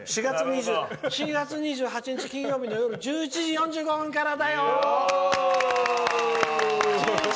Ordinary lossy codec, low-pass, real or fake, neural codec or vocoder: none; none; real; none